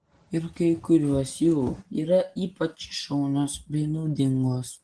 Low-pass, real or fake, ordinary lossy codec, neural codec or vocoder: 9.9 kHz; real; Opus, 16 kbps; none